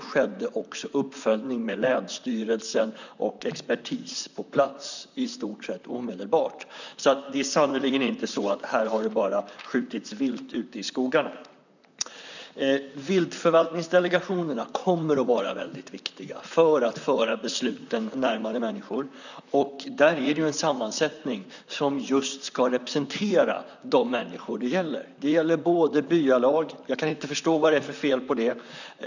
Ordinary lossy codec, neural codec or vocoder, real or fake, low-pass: none; vocoder, 44.1 kHz, 128 mel bands, Pupu-Vocoder; fake; 7.2 kHz